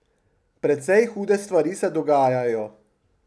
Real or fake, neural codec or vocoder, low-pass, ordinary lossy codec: real; none; none; none